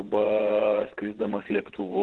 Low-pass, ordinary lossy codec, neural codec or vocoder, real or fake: 9.9 kHz; Opus, 16 kbps; vocoder, 22.05 kHz, 80 mel bands, WaveNeXt; fake